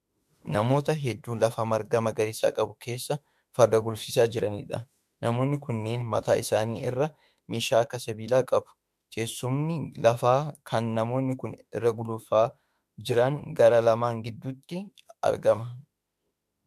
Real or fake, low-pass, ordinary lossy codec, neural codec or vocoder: fake; 14.4 kHz; MP3, 96 kbps; autoencoder, 48 kHz, 32 numbers a frame, DAC-VAE, trained on Japanese speech